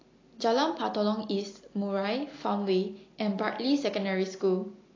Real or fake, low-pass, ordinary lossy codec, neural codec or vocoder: real; 7.2 kHz; AAC, 32 kbps; none